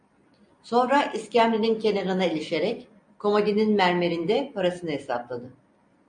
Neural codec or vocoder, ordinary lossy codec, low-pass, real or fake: none; MP3, 48 kbps; 9.9 kHz; real